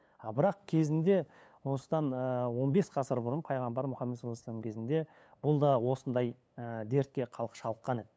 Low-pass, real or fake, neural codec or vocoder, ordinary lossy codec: none; fake; codec, 16 kHz, 8 kbps, FunCodec, trained on LibriTTS, 25 frames a second; none